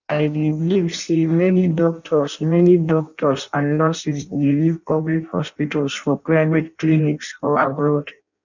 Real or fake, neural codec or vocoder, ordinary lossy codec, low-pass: fake; codec, 16 kHz in and 24 kHz out, 0.6 kbps, FireRedTTS-2 codec; none; 7.2 kHz